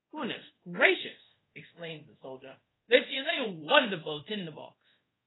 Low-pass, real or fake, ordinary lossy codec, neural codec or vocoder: 7.2 kHz; fake; AAC, 16 kbps; codec, 24 kHz, 0.5 kbps, DualCodec